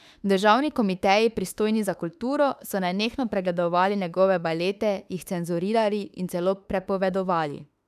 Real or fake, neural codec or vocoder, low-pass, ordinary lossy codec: fake; autoencoder, 48 kHz, 32 numbers a frame, DAC-VAE, trained on Japanese speech; 14.4 kHz; none